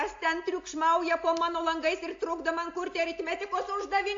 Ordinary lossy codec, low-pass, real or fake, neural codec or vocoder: AAC, 48 kbps; 7.2 kHz; real; none